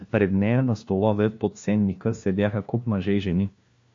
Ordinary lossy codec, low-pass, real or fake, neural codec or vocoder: MP3, 48 kbps; 7.2 kHz; fake; codec, 16 kHz, 1 kbps, FunCodec, trained on LibriTTS, 50 frames a second